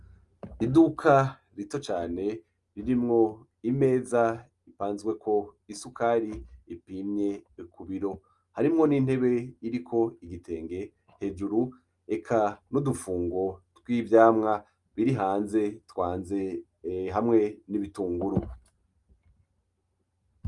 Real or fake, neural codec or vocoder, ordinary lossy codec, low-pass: real; none; Opus, 32 kbps; 10.8 kHz